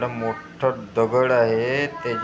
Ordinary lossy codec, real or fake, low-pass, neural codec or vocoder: none; real; none; none